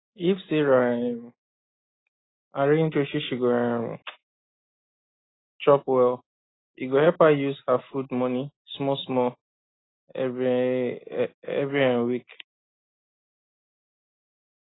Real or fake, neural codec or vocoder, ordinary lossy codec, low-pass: real; none; AAC, 16 kbps; 7.2 kHz